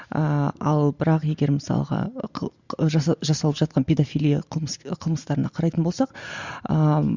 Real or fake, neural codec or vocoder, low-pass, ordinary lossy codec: real; none; 7.2 kHz; Opus, 64 kbps